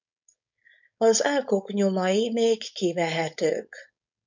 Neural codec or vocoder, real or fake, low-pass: codec, 16 kHz, 4.8 kbps, FACodec; fake; 7.2 kHz